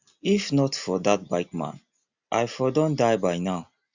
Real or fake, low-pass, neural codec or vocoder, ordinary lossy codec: real; 7.2 kHz; none; Opus, 64 kbps